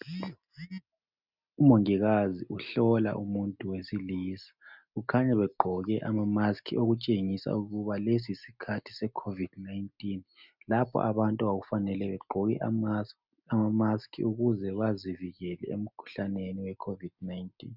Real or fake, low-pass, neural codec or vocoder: real; 5.4 kHz; none